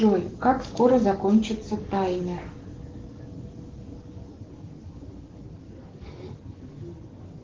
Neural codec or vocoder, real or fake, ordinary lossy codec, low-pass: codec, 44.1 kHz, 7.8 kbps, DAC; fake; Opus, 16 kbps; 7.2 kHz